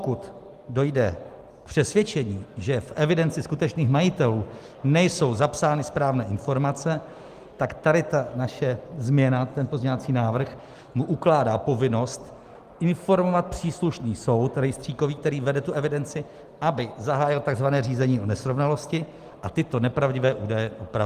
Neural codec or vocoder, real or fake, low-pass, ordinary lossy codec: none; real; 14.4 kHz; Opus, 24 kbps